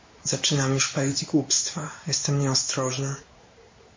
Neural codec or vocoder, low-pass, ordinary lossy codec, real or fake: codec, 16 kHz in and 24 kHz out, 1 kbps, XY-Tokenizer; 7.2 kHz; MP3, 32 kbps; fake